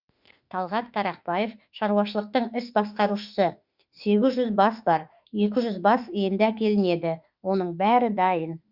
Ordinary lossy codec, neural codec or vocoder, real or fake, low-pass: Opus, 64 kbps; autoencoder, 48 kHz, 32 numbers a frame, DAC-VAE, trained on Japanese speech; fake; 5.4 kHz